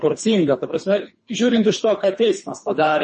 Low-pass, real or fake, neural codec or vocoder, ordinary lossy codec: 10.8 kHz; fake; codec, 24 kHz, 3 kbps, HILCodec; MP3, 32 kbps